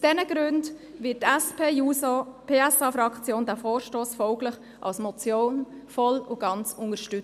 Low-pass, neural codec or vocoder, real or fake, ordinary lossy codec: 14.4 kHz; none; real; MP3, 96 kbps